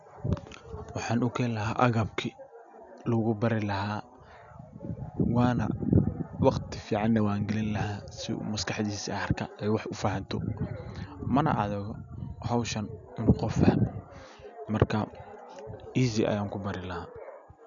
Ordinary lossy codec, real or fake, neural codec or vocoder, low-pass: none; real; none; 7.2 kHz